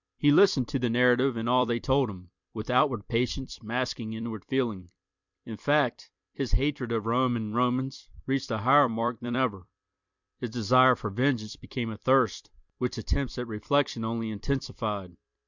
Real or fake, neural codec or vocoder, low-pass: fake; vocoder, 44.1 kHz, 128 mel bands every 256 samples, BigVGAN v2; 7.2 kHz